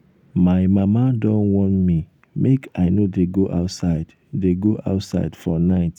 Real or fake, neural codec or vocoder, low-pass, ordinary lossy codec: real; none; 19.8 kHz; none